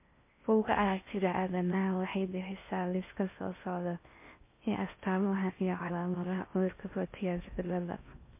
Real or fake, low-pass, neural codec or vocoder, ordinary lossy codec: fake; 3.6 kHz; codec, 16 kHz in and 24 kHz out, 0.6 kbps, FocalCodec, streaming, 4096 codes; MP3, 24 kbps